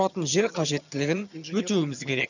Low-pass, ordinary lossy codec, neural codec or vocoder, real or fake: 7.2 kHz; none; vocoder, 22.05 kHz, 80 mel bands, HiFi-GAN; fake